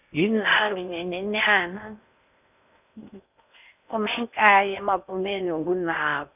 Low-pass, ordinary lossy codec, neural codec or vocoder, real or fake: 3.6 kHz; none; codec, 16 kHz in and 24 kHz out, 0.6 kbps, FocalCodec, streaming, 4096 codes; fake